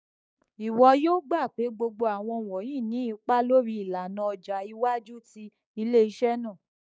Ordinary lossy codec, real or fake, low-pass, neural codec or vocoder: none; fake; none; codec, 16 kHz, 6 kbps, DAC